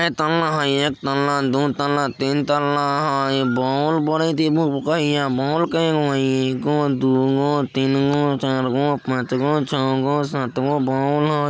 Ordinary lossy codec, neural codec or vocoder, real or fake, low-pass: none; none; real; none